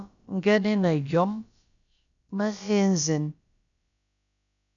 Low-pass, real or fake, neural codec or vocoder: 7.2 kHz; fake; codec, 16 kHz, about 1 kbps, DyCAST, with the encoder's durations